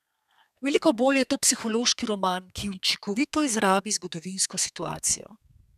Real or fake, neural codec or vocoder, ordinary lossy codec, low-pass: fake; codec, 32 kHz, 1.9 kbps, SNAC; none; 14.4 kHz